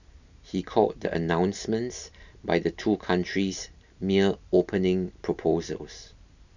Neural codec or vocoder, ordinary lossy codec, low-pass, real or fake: none; none; 7.2 kHz; real